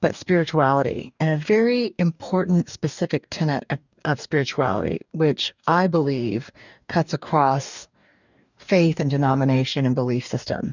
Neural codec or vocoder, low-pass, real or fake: codec, 44.1 kHz, 2.6 kbps, DAC; 7.2 kHz; fake